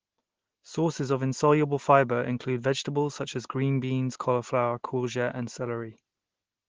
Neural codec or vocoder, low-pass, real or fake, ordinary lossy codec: none; 7.2 kHz; real; Opus, 16 kbps